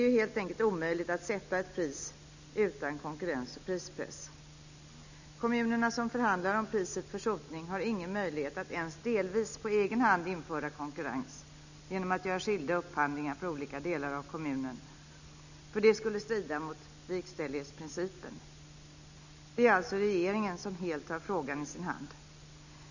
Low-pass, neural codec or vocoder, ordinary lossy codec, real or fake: 7.2 kHz; none; none; real